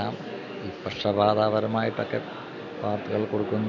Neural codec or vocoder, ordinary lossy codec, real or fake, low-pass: none; none; real; 7.2 kHz